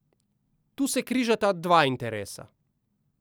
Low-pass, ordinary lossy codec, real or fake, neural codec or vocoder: none; none; real; none